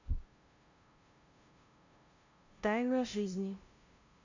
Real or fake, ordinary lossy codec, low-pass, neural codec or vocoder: fake; Opus, 64 kbps; 7.2 kHz; codec, 16 kHz, 0.5 kbps, FunCodec, trained on LibriTTS, 25 frames a second